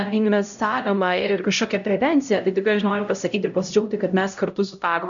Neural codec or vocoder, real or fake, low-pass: codec, 16 kHz, 0.5 kbps, X-Codec, HuBERT features, trained on LibriSpeech; fake; 7.2 kHz